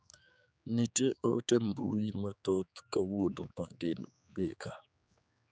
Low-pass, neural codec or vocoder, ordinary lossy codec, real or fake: none; codec, 16 kHz, 4 kbps, X-Codec, HuBERT features, trained on general audio; none; fake